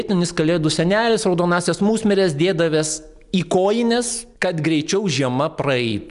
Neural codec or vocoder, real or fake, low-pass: none; real; 10.8 kHz